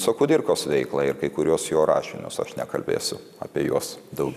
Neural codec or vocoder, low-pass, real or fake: none; 14.4 kHz; real